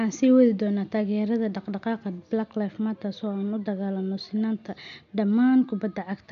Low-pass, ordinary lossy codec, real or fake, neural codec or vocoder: 7.2 kHz; none; real; none